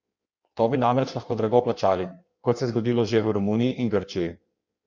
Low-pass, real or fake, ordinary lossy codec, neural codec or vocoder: 7.2 kHz; fake; Opus, 64 kbps; codec, 16 kHz in and 24 kHz out, 1.1 kbps, FireRedTTS-2 codec